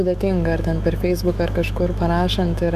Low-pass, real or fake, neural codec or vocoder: 14.4 kHz; fake; autoencoder, 48 kHz, 128 numbers a frame, DAC-VAE, trained on Japanese speech